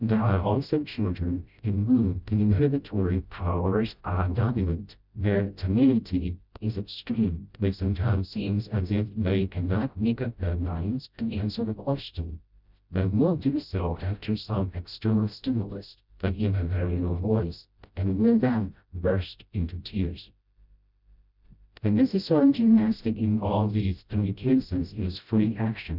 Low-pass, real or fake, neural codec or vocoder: 5.4 kHz; fake; codec, 16 kHz, 0.5 kbps, FreqCodec, smaller model